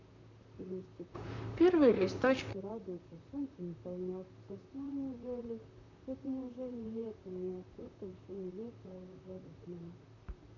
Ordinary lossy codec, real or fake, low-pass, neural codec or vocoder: none; fake; 7.2 kHz; vocoder, 44.1 kHz, 128 mel bands, Pupu-Vocoder